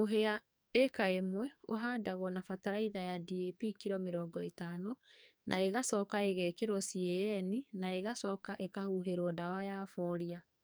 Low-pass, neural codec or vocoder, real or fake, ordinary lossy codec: none; codec, 44.1 kHz, 2.6 kbps, SNAC; fake; none